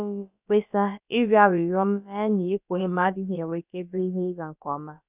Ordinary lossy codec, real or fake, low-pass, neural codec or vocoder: none; fake; 3.6 kHz; codec, 16 kHz, about 1 kbps, DyCAST, with the encoder's durations